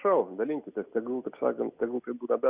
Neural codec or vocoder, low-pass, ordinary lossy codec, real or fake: none; 3.6 kHz; Opus, 64 kbps; real